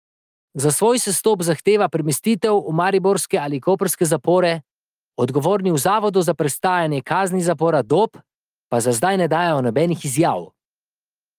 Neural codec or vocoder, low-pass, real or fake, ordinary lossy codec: none; 14.4 kHz; real; Opus, 32 kbps